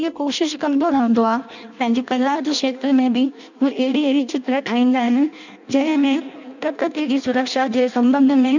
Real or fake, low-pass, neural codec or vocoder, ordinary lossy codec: fake; 7.2 kHz; codec, 16 kHz in and 24 kHz out, 0.6 kbps, FireRedTTS-2 codec; none